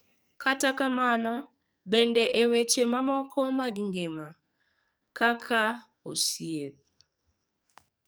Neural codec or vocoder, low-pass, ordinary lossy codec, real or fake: codec, 44.1 kHz, 2.6 kbps, SNAC; none; none; fake